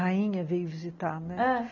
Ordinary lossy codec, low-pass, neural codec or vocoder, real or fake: none; 7.2 kHz; none; real